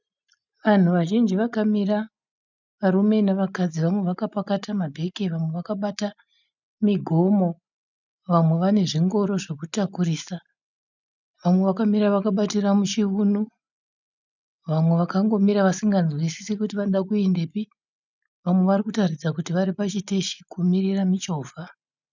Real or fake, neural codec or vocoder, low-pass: real; none; 7.2 kHz